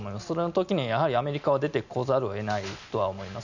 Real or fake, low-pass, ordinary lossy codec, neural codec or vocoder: real; 7.2 kHz; none; none